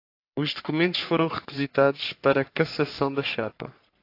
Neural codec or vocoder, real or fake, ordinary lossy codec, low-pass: codec, 44.1 kHz, 3.4 kbps, Pupu-Codec; fake; AAC, 32 kbps; 5.4 kHz